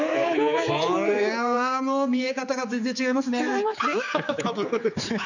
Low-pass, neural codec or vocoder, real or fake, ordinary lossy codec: 7.2 kHz; codec, 16 kHz, 4 kbps, X-Codec, HuBERT features, trained on general audio; fake; none